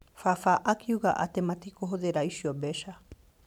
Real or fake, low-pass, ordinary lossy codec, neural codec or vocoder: fake; 19.8 kHz; none; vocoder, 44.1 kHz, 128 mel bands every 512 samples, BigVGAN v2